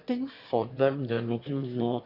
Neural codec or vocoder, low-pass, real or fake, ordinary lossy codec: autoencoder, 22.05 kHz, a latent of 192 numbers a frame, VITS, trained on one speaker; 5.4 kHz; fake; AAC, 32 kbps